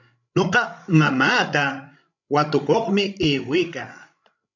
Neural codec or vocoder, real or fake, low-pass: codec, 16 kHz, 16 kbps, FreqCodec, larger model; fake; 7.2 kHz